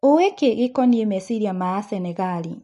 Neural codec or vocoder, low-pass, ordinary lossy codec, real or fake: none; 14.4 kHz; MP3, 48 kbps; real